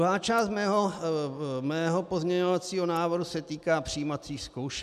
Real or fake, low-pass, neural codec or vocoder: real; 14.4 kHz; none